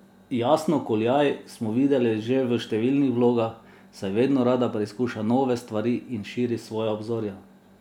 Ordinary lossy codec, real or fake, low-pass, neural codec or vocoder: none; real; 19.8 kHz; none